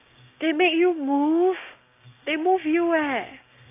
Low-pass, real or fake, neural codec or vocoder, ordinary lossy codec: 3.6 kHz; fake; codec, 16 kHz, 6 kbps, DAC; AAC, 24 kbps